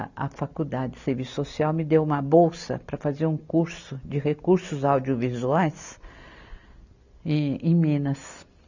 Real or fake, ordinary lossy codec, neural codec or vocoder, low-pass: real; none; none; 7.2 kHz